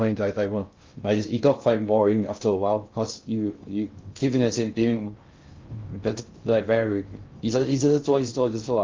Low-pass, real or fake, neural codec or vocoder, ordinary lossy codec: 7.2 kHz; fake; codec, 16 kHz in and 24 kHz out, 0.6 kbps, FocalCodec, streaming, 2048 codes; Opus, 24 kbps